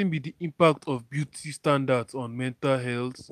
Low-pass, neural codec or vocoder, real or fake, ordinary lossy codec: 14.4 kHz; none; real; none